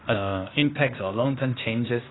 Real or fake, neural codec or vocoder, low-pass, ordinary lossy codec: fake; codec, 16 kHz, 0.8 kbps, ZipCodec; 7.2 kHz; AAC, 16 kbps